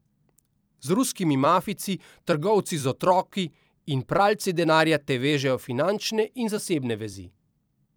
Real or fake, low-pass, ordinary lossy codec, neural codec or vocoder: fake; none; none; vocoder, 44.1 kHz, 128 mel bands every 256 samples, BigVGAN v2